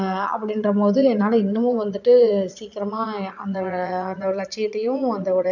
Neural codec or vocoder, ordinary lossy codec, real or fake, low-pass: vocoder, 22.05 kHz, 80 mel bands, Vocos; none; fake; 7.2 kHz